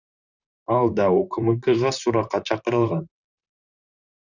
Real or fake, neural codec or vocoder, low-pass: fake; vocoder, 44.1 kHz, 128 mel bands, Pupu-Vocoder; 7.2 kHz